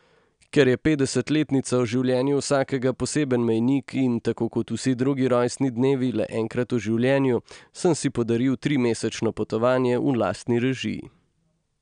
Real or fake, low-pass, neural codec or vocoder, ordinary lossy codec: real; 9.9 kHz; none; none